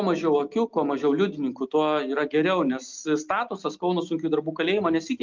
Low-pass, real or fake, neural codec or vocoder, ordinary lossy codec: 7.2 kHz; real; none; Opus, 32 kbps